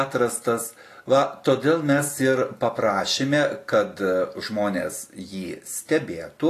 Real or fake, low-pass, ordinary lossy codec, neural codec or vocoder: real; 14.4 kHz; AAC, 48 kbps; none